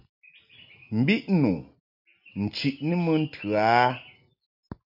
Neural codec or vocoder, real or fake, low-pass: none; real; 5.4 kHz